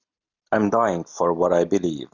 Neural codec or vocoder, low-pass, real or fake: none; 7.2 kHz; real